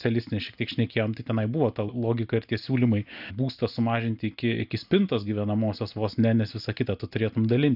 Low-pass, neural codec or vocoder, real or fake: 5.4 kHz; none; real